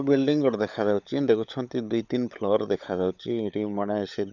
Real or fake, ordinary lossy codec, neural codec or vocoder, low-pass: fake; none; codec, 16 kHz, 16 kbps, FunCodec, trained on LibriTTS, 50 frames a second; 7.2 kHz